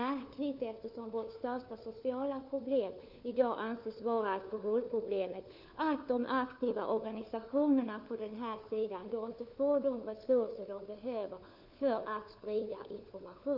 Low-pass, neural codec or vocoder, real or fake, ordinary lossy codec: 5.4 kHz; codec, 16 kHz, 4 kbps, FunCodec, trained on LibriTTS, 50 frames a second; fake; none